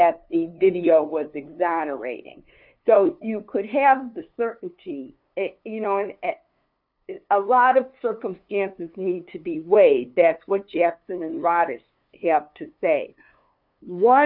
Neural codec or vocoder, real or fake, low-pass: codec, 16 kHz, 2 kbps, FunCodec, trained on LibriTTS, 25 frames a second; fake; 5.4 kHz